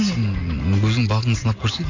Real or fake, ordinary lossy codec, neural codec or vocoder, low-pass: real; MP3, 64 kbps; none; 7.2 kHz